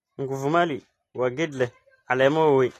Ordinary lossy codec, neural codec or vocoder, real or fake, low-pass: AAC, 48 kbps; none; real; 14.4 kHz